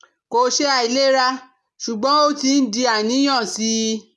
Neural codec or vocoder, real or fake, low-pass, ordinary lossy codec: none; real; none; none